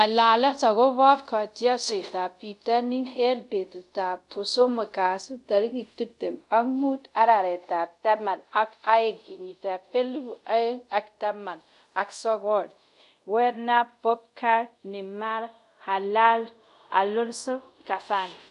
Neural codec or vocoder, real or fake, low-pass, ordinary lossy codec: codec, 24 kHz, 0.5 kbps, DualCodec; fake; 10.8 kHz; none